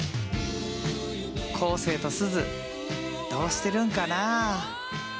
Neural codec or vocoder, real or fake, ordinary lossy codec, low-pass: none; real; none; none